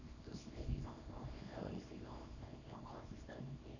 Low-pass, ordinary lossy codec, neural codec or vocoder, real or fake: 7.2 kHz; none; codec, 24 kHz, 0.9 kbps, WavTokenizer, small release; fake